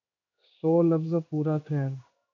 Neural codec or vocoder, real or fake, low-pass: codec, 16 kHz in and 24 kHz out, 1 kbps, XY-Tokenizer; fake; 7.2 kHz